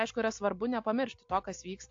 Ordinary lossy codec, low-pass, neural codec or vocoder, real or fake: AAC, 48 kbps; 7.2 kHz; none; real